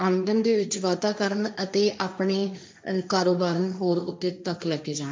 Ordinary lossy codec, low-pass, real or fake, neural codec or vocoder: none; none; fake; codec, 16 kHz, 1.1 kbps, Voila-Tokenizer